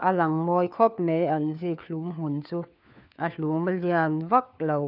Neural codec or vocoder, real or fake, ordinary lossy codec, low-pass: codec, 16 kHz, 4 kbps, FunCodec, trained on LibriTTS, 50 frames a second; fake; none; 5.4 kHz